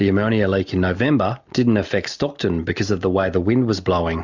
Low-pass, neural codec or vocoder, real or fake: 7.2 kHz; none; real